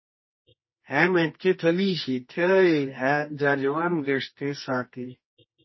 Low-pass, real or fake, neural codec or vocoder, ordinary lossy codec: 7.2 kHz; fake; codec, 24 kHz, 0.9 kbps, WavTokenizer, medium music audio release; MP3, 24 kbps